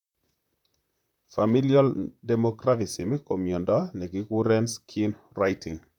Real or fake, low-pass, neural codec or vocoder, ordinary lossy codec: fake; 19.8 kHz; vocoder, 44.1 kHz, 128 mel bands, Pupu-Vocoder; none